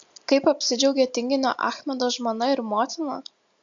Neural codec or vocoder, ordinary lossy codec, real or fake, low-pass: none; AAC, 64 kbps; real; 7.2 kHz